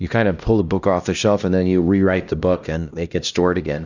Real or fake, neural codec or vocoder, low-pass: fake; codec, 16 kHz, 1 kbps, X-Codec, HuBERT features, trained on LibriSpeech; 7.2 kHz